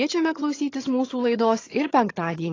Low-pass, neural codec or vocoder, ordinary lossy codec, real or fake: 7.2 kHz; vocoder, 22.05 kHz, 80 mel bands, HiFi-GAN; AAC, 32 kbps; fake